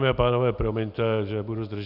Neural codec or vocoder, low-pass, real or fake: none; 5.4 kHz; real